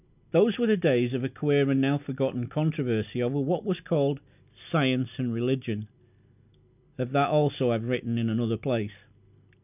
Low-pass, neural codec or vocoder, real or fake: 3.6 kHz; none; real